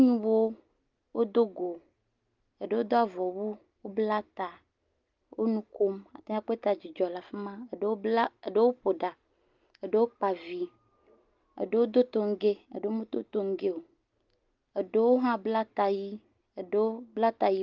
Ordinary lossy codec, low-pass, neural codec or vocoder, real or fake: Opus, 32 kbps; 7.2 kHz; none; real